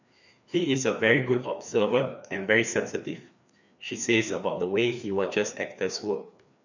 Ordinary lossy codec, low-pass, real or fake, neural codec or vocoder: none; 7.2 kHz; fake; codec, 16 kHz, 2 kbps, FreqCodec, larger model